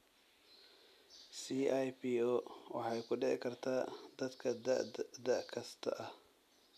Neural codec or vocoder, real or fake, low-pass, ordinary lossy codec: none; real; 14.4 kHz; none